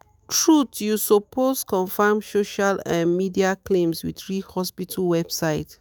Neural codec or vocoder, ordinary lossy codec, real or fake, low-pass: autoencoder, 48 kHz, 128 numbers a frame, DAC-VAE, trained on Japanese speech; none; fake; none